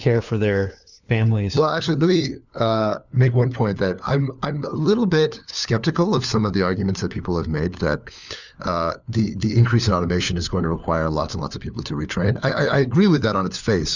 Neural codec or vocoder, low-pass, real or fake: codec, 16 kHz, 4 kbps, FunCodec, trained on LibriTTS, 50 frames a second; 7.2 kHz; fake